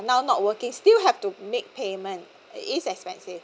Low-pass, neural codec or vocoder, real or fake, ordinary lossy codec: none; none; real; none